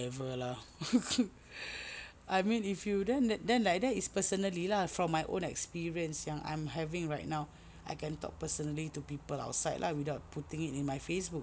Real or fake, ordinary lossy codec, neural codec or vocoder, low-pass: real; none; none; none